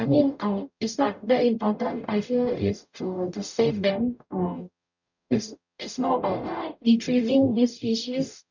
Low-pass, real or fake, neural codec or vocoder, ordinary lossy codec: 7.2 kHz; fake; codec, 44.1 kHz, 0.9 kbps, DAC; none